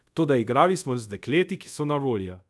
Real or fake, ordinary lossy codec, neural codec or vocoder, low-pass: fake; none; codec, 24 kHz, 0.5 kbps, DualCodec; none